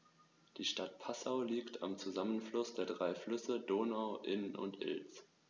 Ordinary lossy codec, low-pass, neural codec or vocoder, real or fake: none; none; none; real